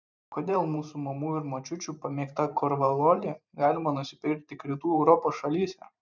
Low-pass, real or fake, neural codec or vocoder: 7.2 kHz; fake; vocoder, 44.1 kHz, 128 mel bands every 512 samples, BigVGAN v2